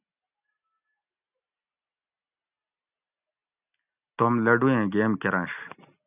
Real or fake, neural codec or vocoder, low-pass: real; none; 3.6 kHz